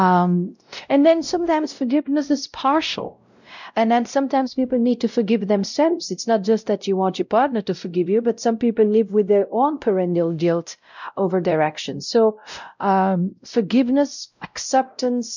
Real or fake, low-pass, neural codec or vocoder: fake; 7.2 kHz; codec, 16 kHz, 0.5 kbps, X-Codec, WavLM features, trained on Multilingual LibriSpeech